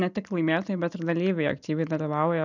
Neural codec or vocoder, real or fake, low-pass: none; real; 7.2 kHz